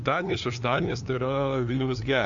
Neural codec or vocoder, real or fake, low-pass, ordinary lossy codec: codec, 16 kHz, 2 kbps, FunCodec, trained on LibriTTS, 25 frames a second; fake; 7.2 kHz; AAC, 64 kbps